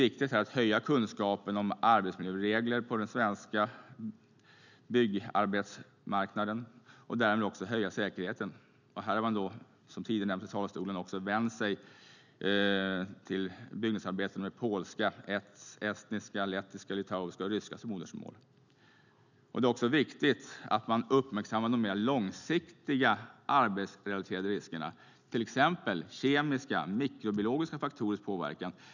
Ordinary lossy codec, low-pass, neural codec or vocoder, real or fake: none; 7.2 kHz; none; real